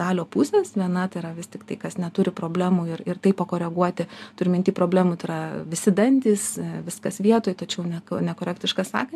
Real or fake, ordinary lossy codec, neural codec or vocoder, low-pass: real; AAC, 96 kbps; none; 14.4 kHz